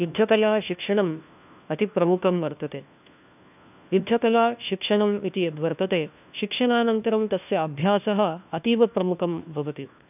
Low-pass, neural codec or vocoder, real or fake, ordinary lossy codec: 3.6 kHz; codec, 16 kHz, 1 kbps, FunCodec, trained on LibriTTS, 50 frames a second; fake; none